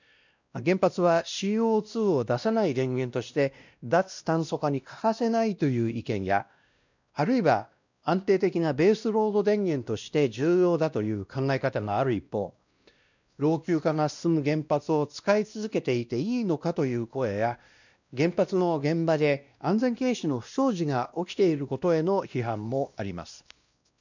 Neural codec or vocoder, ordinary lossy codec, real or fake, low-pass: codec, 16 kHz, 1 kbps, X-Codec, WavLM features, trained on Multilingual LibriSpeech; none; fake; 7.2 kHz